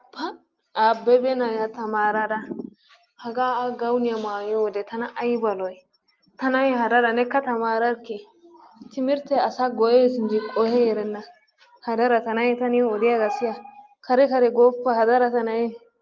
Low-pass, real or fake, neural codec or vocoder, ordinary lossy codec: 7.2 kHz; real; none; Opus, 32 kbps